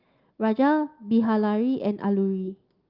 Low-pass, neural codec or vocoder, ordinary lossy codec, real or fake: 5.4 kHz; none; Opus, 24 kbps; real